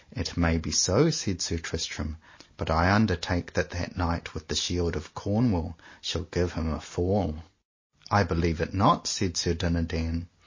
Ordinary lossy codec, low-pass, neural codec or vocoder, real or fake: MP3, 32 kbps; 7.2 kHz; none; real